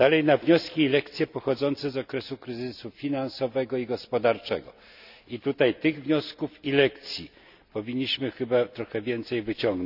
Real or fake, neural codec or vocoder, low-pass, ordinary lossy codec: real; none; 5.4 kHz; none